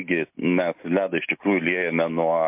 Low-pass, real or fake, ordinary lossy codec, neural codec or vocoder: 3.6 kHz; real; MP3, 32 kbps; none